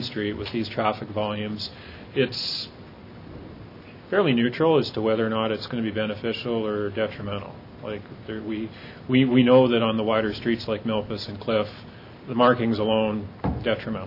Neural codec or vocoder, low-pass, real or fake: none; 5.4 kHz; real